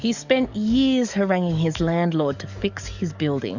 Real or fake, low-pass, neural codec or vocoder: fake; 7.2 kHz; codec, 44.1 kHz, 7.8 kbps, DAC